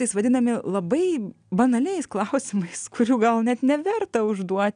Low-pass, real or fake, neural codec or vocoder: 9.9 kHz; real; none